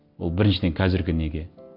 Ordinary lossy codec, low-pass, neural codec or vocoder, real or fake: none; 5.4 kHz; none; real